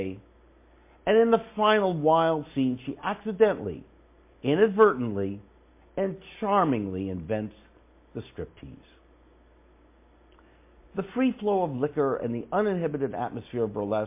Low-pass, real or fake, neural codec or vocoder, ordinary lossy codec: 3.6 kHz; real; none; MP3, 24 kbps